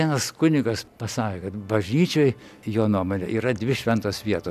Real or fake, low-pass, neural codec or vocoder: real; 14.4 kHz; none